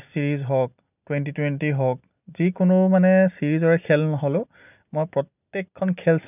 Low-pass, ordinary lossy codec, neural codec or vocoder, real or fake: 3.6 kHz; none; none; real